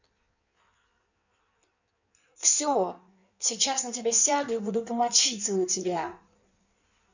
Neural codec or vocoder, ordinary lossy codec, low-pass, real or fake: codec, 16 kHz in and 24 kHz out, 1.1 kbps, FireRedTTS-2 codec; none; 7.2 kHz; fake